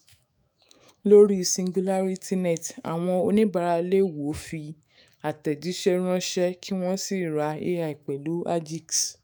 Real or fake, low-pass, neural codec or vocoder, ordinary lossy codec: fake; none; autoencoder, 48 kHz, 128 numbers a frame, DAC-VAE, trained on Japanese speech; none